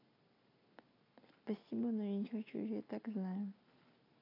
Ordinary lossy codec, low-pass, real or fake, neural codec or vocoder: AAC, 24 kbps; 5.4 kHz; real; none